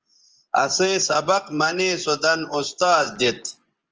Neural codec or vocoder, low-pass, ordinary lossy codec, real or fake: vocoder, 24 kHz, 100 mel bands, Vocos; 7.2 kHz; Opus, 24 kbps; fake